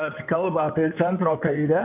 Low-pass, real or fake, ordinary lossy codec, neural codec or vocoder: 3.6 kHz; fake; AAC, 32 kbps; codec, 16 kHz in and 24 kHz out, 2.2 kbps, FireRedTTS-2 codec